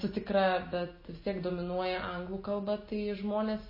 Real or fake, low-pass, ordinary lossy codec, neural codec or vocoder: real; 5.4 kHz; MP3, 24 kbps; none